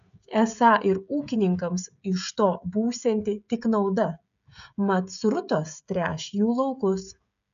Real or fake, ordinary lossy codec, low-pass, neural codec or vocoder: fake; AAC, 96 kbps; 7.2 kHz; codec, 16 kHz, 16 kbps, FreqCodec, smaller model